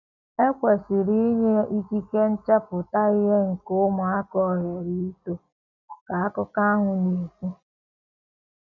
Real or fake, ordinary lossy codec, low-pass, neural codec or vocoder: real; none; 7.2 kHz; none